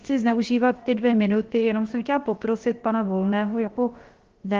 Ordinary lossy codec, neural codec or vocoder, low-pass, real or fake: Opus, 16 kbps; codec, 16 kHz, about 1 kbps, DyCAST, with the encoder's durations; 7.2 kHz; fake